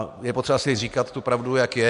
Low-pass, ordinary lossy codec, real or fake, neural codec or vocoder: 10.8 kHz; MP3, 64 kbps; real; none